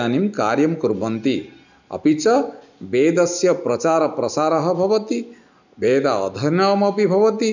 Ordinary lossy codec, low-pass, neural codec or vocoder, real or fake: none; 7.2 kHz; none; real